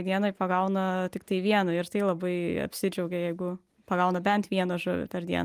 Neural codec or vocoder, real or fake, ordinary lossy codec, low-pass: none; real; Opus, 32 kbps; 14.4 kHz